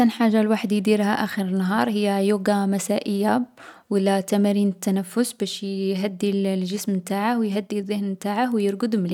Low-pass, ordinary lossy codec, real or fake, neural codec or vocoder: 19.8 kHz; none; real; none